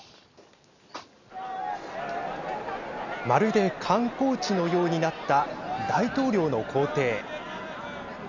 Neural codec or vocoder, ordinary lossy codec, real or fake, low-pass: none; none; real; 7.2 kHz